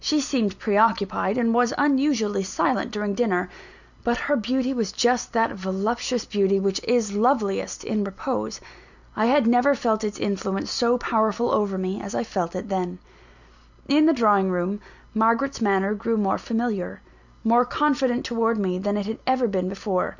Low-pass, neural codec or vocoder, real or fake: 7.2 kHz; none; real